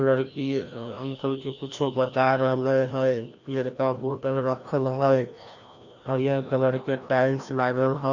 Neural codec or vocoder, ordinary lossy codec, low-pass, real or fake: codec, 16 kHz, 1 kbps, FreqCodec, larger model; Opus, 64 kbps; 7.2 kHz; fake